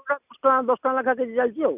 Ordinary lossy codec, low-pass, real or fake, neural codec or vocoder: none; 3.6 kHz; real; none